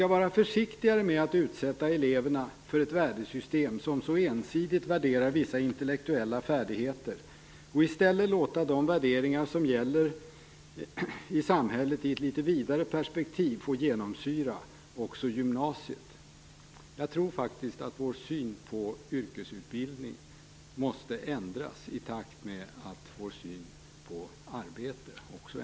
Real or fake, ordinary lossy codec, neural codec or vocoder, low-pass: real; none; none; none